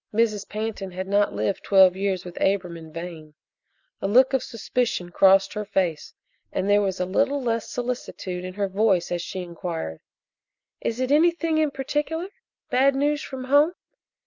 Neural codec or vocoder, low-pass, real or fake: none; 7.2 kHz; real